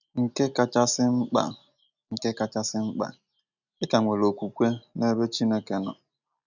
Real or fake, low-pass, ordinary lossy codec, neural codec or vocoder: real; 7.2 kHz; none; none